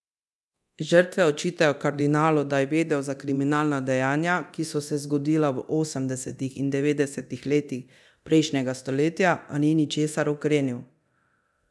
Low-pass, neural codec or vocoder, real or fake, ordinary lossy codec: none; codec, 24 kHz, 0.9 kbps, DualCodec; fake; none